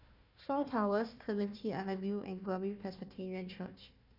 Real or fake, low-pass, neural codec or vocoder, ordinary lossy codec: fake; 5.4 kHz; codec, 16 kHz, 1 kbps, FunCodec, trained on Chinese and English, 50 frames a second; none